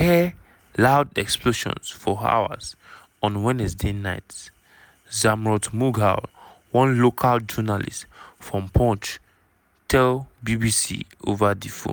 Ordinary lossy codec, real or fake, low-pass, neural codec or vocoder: none; real; none; none